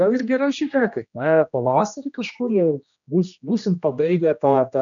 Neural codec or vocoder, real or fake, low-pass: codec, 16 kHz, 1 kbps, X-Codec, HuBERT features, trained on general audio; fake; 7.2 kHz